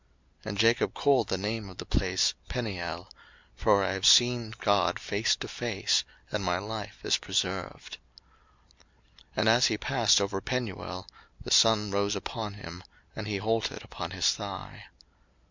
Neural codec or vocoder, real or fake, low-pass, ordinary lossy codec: none; real; 7.2 kHz; MP3, 64 kbps